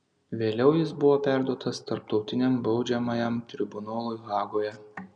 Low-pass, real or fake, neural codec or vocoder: 9.9 kHz; real; none